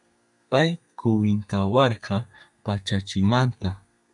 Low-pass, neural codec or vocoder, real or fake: 10.8 kHz; codec, 32 kHz, 1.9 kbps, SNAC; fake